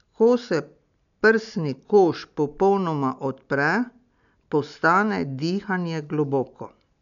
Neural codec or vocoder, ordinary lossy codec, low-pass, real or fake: none; none; 7.2 kHz; real